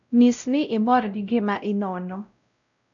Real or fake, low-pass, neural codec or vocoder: fake; 7.2 kHz; codec, 16 kHz, 0.5 kbps, X-Codec, WavLM features, trained on Multilingual LibriSpeech